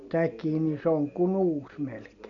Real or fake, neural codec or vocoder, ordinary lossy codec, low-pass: real; none; none; 7.2 kHz